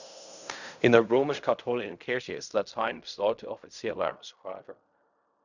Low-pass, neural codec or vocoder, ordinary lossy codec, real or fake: 7.2 kHz; codec, 16 kHz in and 24 kHz out, 0.4 kbps, LongCat-Audio-Codec, fine tuned four codebook decoder; none; fake